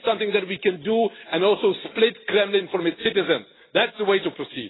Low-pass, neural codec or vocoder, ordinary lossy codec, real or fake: 7.2 kHz; none; AAC, 16 kbps; real